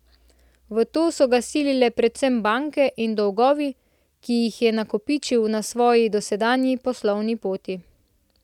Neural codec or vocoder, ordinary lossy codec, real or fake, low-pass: none; none; real; 19.8 kHz